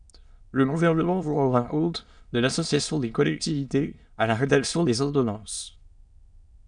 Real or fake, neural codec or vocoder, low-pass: fake; autoencoder, 22.05 kHz, a latent of 192 numbers a frame, VITS, trained on many speakers; 9.9 kHz